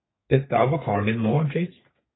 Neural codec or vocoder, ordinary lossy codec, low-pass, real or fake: codec, 32 kHz, 1.9 kbps, SNAC; AAC, 16 kbps; 7.2 kHz; fake